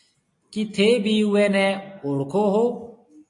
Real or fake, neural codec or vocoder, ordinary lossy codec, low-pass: real; none; AAC, 48 kbps; 10.8 kHz